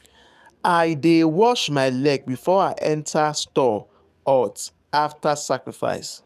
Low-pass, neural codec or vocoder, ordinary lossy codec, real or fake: 14.4 kHz; codec, 44.1 kHz, 7.8 kbps, DAC; none; fake